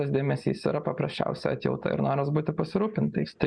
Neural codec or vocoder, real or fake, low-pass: none; real; 9.9 kHz